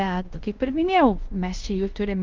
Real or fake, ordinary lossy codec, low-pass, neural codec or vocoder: fake; Opus, 32 kbps; 7.2 kHz; codec, 16 kHz in and 24 kHz out, 0.9 kbps, LongCat-Audio-Codec, fine tuned four codebook decoder